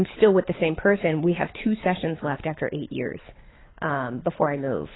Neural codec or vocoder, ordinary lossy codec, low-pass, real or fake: codec, 24 kHz, 6 kbps, HILCodec; AAC, 16 kbps; 7.2 kHz; fake